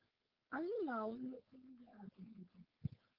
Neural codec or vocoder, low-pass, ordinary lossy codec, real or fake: codec, 16 kHz, 4.8 kbps, FACodec; 5.4 kHz; Opus, 24 kbps; fake